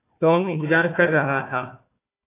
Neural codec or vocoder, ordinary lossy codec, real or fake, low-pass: codec, 16 kHz, 1 kbps, FunCodec, trained on Chinese and English, 50 frames a second; AAC, 24 kbps; fake; 3.6 kHz